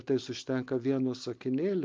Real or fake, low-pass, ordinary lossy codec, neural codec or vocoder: real; 7.2 kHz; Opus, 32 kbps; none